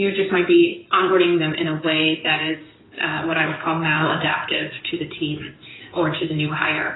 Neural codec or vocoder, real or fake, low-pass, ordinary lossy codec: codec, 16 kHz in and 24 kHz out, 2.2 kbps, FireRedTTS-2 codec; fake; 7.2 kHz; AAC, 16 kbps